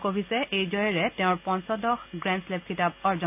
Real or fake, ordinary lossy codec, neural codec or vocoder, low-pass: real; none; none; 3.6 kHz